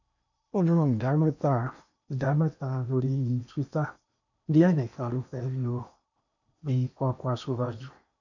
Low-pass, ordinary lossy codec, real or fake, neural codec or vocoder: 7.2 kHz; none; fake; codec, 16 kHz in and 24 kHz out, 0.8 kbps, FocalCodec, streaming, 65536 codes